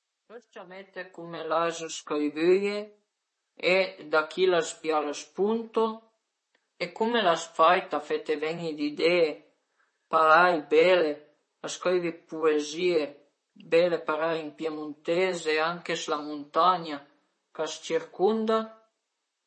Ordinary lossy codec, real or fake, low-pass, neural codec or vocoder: MP3, 32 kbps; fake; 10.8 kHz; vocoder, 44.1 kHz, 128 mel bands, Pupu-Vocoder